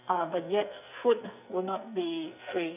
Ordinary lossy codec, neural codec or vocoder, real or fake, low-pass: none; codec, 44.1 kHz, 2.6 kbps, SNAC; fake; 3.6 kHz